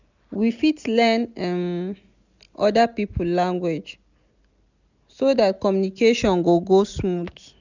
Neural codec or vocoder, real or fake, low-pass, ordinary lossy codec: none; real; 7.2 kHz; none